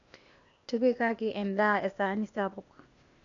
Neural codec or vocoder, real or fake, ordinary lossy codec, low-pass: codec, 16 kHz, 0.8 kbps, ZipCodec; fake; none; 7.2 kHz